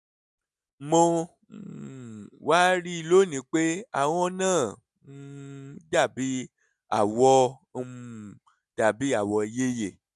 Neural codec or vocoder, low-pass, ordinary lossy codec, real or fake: none; none; none; real